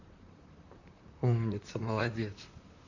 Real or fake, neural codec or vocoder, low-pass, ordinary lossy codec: fake; vocoder, 44.1 kHz, 128 mel bands, Pupu-Vocoder; 7.2 kHz; none